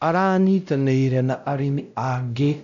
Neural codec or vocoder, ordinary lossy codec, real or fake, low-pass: codec, 16 kHz, 0.5 kbps, X-Codec, WavLM features, trained on Multilingual LibriSpeech; none; fake; 7.2 kHz